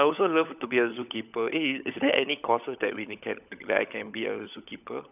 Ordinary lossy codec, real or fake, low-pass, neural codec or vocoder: none; fake; 3.6 kHz; codec, 16 kHz, 16 kbps, FunCodec, trained on LibriTTS, 50 frames a second